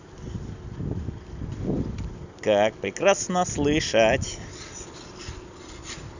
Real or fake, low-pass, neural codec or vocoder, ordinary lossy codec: real; 7.2 kHz; none; none